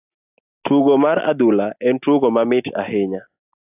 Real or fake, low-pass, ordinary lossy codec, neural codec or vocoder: real; 3.6 kHz; AAC, 32 kbps; none